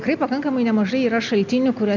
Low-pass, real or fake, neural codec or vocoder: 7.2 kHz; real; none